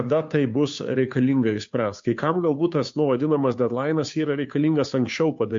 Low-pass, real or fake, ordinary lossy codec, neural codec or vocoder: 7.2 kHz; fake; MP3, 48 kbps; codec, 16 kHz, 2 kbps, FunCodec, trained on Chinese and English, 25 frames a second